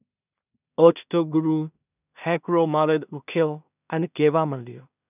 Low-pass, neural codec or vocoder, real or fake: 3.6 kHz; codec, 16 kHz in and 24 kHz out, 0.4 kbps, LongCat-Audio-Codec, two codebook decoder; fake